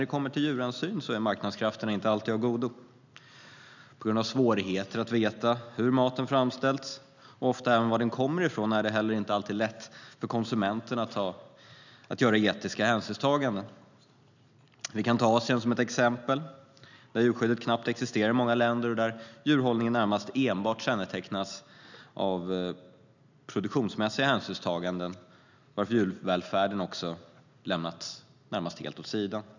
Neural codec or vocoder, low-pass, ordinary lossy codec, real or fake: none; 7.2 kHz; none; real